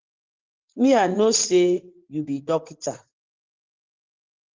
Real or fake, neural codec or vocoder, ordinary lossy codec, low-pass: fake; vocoder, 44.1 kHz, 80 mel bands, Vocos; Opus, 16 kbps; 7.2 kHz